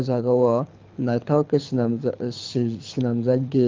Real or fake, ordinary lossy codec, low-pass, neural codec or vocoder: fake; Opus, 32 kbps; 7.2 kHz; codec, 24 kHz, 6 kbps, HILCodec